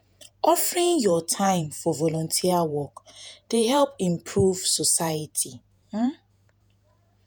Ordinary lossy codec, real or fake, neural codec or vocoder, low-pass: none; fake; vocoder, 48 kHz, 128 mel bands, Vocos; none